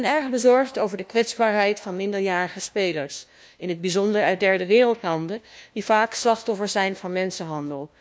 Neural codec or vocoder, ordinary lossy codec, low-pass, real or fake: codec, 16 kHz, 1 kbps, FunCodec, trained on LibriTTS, 50 frames a second; none; none; fake